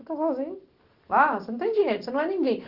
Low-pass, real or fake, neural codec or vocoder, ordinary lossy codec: 5.4 kHz; real; none; Opus, 16 kbps